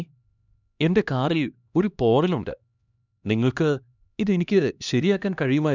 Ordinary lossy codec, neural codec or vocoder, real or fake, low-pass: none; codec, 16 kHz, 1 kbps, X-Codec, HuBERT features, trained on LibriSpeech; fake; 7.2 kHz